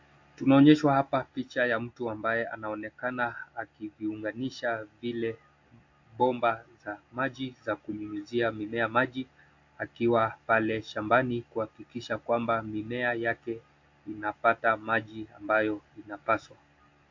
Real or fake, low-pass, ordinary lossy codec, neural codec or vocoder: real; 7.2 kHz; AAC, 48 kbps; none